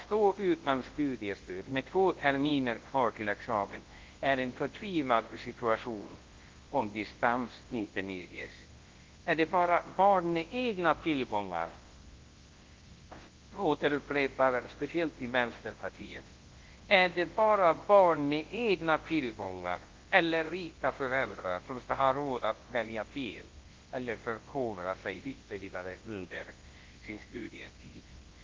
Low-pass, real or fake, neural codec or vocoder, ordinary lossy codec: 7.2 kHz; fake; codec, 24 kHz, 0.9 kbps, WavTokenizer, large speech release; Opus, 16 kbps